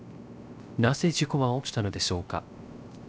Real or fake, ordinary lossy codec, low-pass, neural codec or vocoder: fake; none; none; codec, 16 kHz, 0.3 kbps, FocalCodec